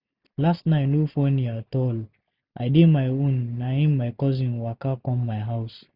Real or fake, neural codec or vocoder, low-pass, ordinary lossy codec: real; none; 5.4 kHz; none